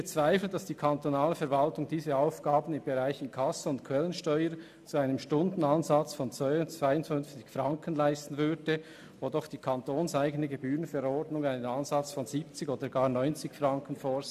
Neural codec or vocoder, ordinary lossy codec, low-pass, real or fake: none; AAC, 96 kbps; 14.4 kHz; real